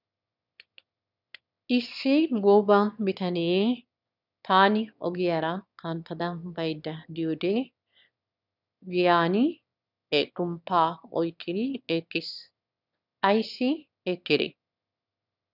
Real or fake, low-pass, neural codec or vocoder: fake; 5.4 kHz; autoencoder, 22.05 kHz, a latent of 192 numbers a frame, VITS, trained on one speaker